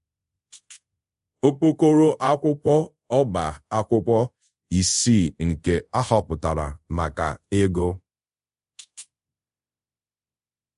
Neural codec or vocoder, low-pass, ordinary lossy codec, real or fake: codec, 24 kHz, 0.5 kbps, DualCodec; 10.8 kHz; MP3, 48 kbps; fake